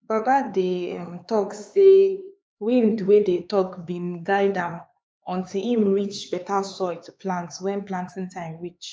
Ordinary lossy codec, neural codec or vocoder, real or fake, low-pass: none; codec, 16 kHz, 4 kbps, X-Codec, HuBERT features, trained on LibriSpeech; fake; none